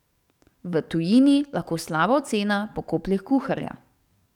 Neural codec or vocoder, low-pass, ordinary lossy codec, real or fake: codec, 44.1 kHz, 7.8 kbps, DAC; 19.8 kHz; none; fake